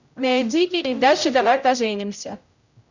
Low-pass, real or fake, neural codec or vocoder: 7.2 kHz; fake; codec, 16 kHz, 0.5 kbps, X-Codec, HuBERT features, trained on general audio